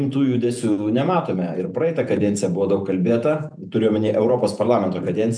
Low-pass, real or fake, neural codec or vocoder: 9.9 kHz; fake; vocoder, 48 kHz, 128 mel bands, Vocos